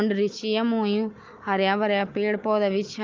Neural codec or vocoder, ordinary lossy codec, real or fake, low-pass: none; Opus, 24 kbps; real; 7.2 kHz